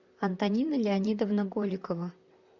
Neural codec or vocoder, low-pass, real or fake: vocoder, 44.1 kHz, 128 mel bands, Pupu-Vocoder; 7.2 kHz; fake